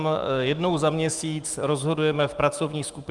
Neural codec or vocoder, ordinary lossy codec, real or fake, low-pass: autoencoder, 48 kHz, 128 numbers a frame, DAC-VAE, trained on Japanese speech; Opus, 32 kbps; fake; 10.8 kHz